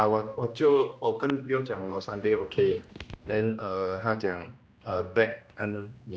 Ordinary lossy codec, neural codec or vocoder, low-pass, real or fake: none; codec, 16 kHz, 1 kbps, X-Codec, HuBERT features, trained on general audio; none; fake